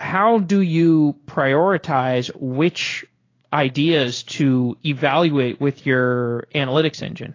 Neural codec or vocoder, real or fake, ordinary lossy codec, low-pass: codec, 16 kHz in and 24 kHz out, 1 kbps, XY-Tokenizer; fake; AAC, 32 kbps; 7.2 kHz